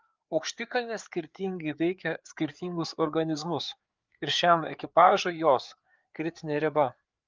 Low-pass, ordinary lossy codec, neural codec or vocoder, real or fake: 7.2 kHz; Opus, 24 kbps; codec, 16 kHz, 4 kbps, FreqCodec, larger model; fake